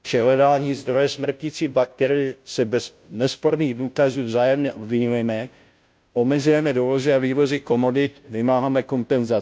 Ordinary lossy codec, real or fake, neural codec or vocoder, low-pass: none; fake; codec, 16 kHz, 0.5 kbps, FunCodec, trained on Chinese and English, 25 frames a second; none